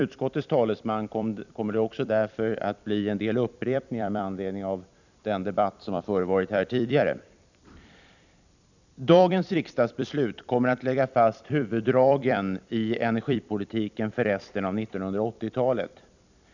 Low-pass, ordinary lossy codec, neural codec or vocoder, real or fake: 7.2 kHz; none; vocoder, 44.1 kHz, 128 mel bands every 256 samples, BigVGAN v2; fake